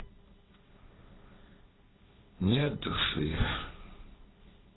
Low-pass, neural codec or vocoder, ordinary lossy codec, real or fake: 7.2 kHz; codec, 16 kHz, 1.1 kbps, Voila-Tokenizer; AAC, 16 kbps; fake